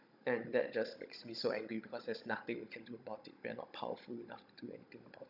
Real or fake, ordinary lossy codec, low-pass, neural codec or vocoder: fake; none; 5.4 kHz; codec, 16 kHz, 16 kbps, FunCodec, trained on Chinese and English, 50 frames a second